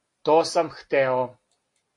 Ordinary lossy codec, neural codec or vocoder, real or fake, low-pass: AAC, 48 kbps; none; real; 10.8 kHz